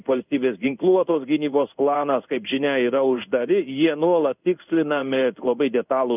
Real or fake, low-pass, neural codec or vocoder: fake; 3.6 kHz; codec, 16 kHz in and 24 kHz out, 1 kbps, XY-Tokenizer